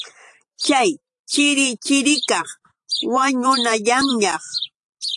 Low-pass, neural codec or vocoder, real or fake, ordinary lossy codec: 10.8 kHz; vocoder, 44.1 kHz, 128 mel bands every 512 samples, BigVGAN v2; fake; AAC, 64 kbps